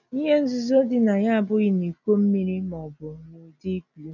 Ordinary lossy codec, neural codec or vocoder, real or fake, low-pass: none; none; real; 7.2 kHz